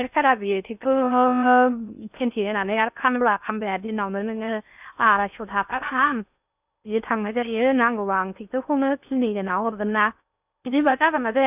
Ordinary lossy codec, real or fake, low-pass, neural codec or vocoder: none; fake; 3.6 kHz; codec, 16 kHz in and 24 kHz out, 0.6 kbps, FocalCodec, streaming, 4096 codes